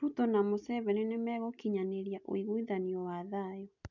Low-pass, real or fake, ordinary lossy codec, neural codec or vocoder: 7.2 kHz; real; none; none